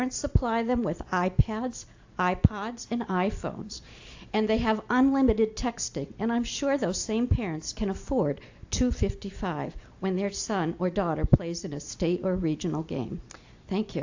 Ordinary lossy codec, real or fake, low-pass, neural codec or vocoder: AAC, 48 kbps; real; 7.2 kHz; none